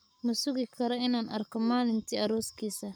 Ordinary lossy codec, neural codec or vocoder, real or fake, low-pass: none; codec, 44.1 kHz, 7.8 kbps, Pupu-Codec; fake; none